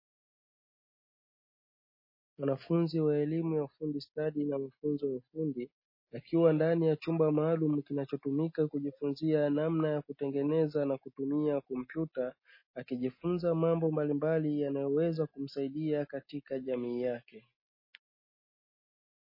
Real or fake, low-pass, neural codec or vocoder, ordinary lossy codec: real; 5.4 kHz; none; MP3, 24 kbps